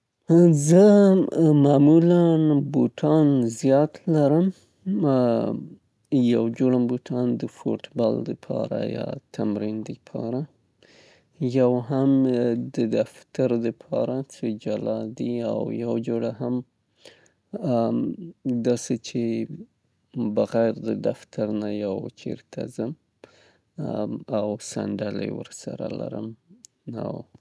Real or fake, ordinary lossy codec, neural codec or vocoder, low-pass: real; none; none; none